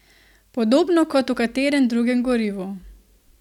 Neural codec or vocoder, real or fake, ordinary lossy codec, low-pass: none; real; none; 19.8 kHz